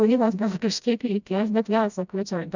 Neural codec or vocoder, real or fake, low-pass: codec, 16 kHz, 0.5 kbps, FreqCodec, smaller model; fake; 7.2 kHz